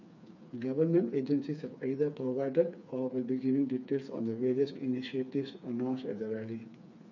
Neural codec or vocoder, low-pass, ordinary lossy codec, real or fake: codec, 16 kHz, 4 kbps, FreqCodec, smaller model; 7.2 kHz; none; fake